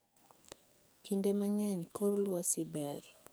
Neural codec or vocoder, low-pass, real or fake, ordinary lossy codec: codec, 44.1 kHz, 2.6 kbps, SNAC; none; fake; none